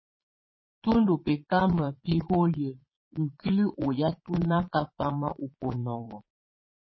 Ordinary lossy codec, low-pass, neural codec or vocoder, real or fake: MP3, 24 kbps; 7.2 kHz; vocoder, 22.05 kHz, 80 mel bands, Vocos; fake